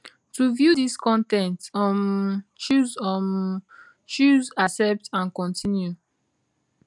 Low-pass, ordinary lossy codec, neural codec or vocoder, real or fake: 10.8 kHz; none; none; real